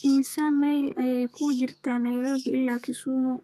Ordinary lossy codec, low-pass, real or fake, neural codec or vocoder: none; 14.4 kHz; fake; codec, 32 kHz, 1.9 kbps, SNAC